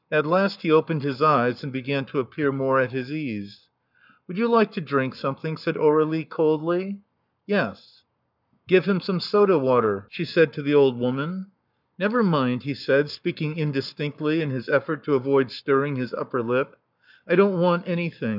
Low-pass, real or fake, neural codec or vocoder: 5.4 kHz; fake; codec, 44.1 kHz, 7.8 kbps, Pupu-Codec